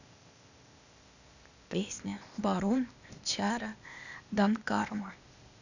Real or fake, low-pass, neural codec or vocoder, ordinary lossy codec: fake; 7.2 kHz; codec, 16 kHz, 0.8 kbps, ZipCodec; none